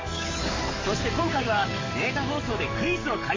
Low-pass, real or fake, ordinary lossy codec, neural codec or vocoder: 7.2 kHz; fake; MP3, 32 kbps; codec, 44.1 kHz, 7.8 kbps, DAC